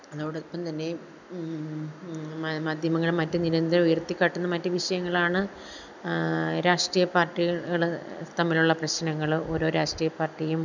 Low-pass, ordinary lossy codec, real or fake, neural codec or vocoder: 7.2 kHz; none; real; none